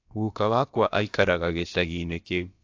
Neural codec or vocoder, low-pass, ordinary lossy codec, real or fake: codec, 16 kHz, about 1 kbps, DyCAST, with the encoder's durations; 7.2 kHz; AAC, 48 kbps; fake